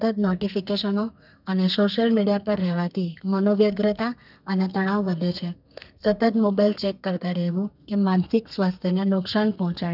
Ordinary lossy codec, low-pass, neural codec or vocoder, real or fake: none; 5.4 kHz; codec, 32 kHz, 1.9 kbps, SNAC; fake